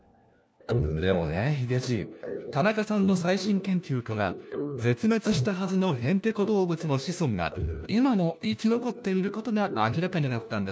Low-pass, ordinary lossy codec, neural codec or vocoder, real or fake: none; none; codec, 16 kHz, 1 kbps, FunCodec, trained on LibriTTS, 50 frames a second; fake